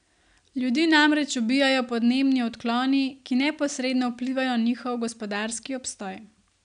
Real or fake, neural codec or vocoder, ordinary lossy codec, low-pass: real; none; none; 9.9 kHz